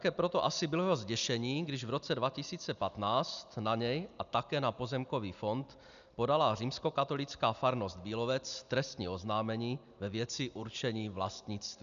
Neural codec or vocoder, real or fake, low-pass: none; real; 7.2 kHz